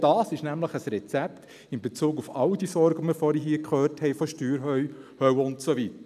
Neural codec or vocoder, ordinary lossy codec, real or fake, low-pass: none; none; real; 14.4 kHz